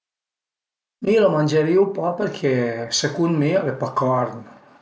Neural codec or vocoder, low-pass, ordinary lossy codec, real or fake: none; none; none; real